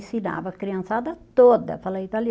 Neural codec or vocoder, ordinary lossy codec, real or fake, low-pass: none; none; real; none